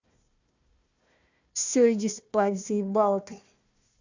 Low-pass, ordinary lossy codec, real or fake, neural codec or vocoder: 7.2 kHz; Opus, 64 kbps; fake; codec, 16 kHz, 1 kbps, FunCodec, trained on Chinese and English, 50 frames a second